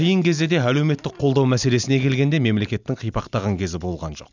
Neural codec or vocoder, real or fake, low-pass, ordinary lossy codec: none; real; 7.2 kHz; none